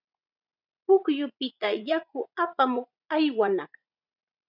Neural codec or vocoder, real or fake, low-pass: vocoder, 44.1 kHz, 80 mel bands, Vocos; fake; 5.4 kHz